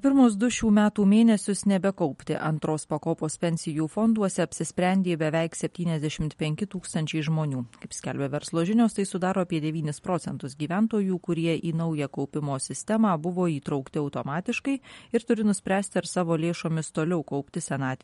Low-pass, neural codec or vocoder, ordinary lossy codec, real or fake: 19.8 kHz; none; MP3, 48 kbps; real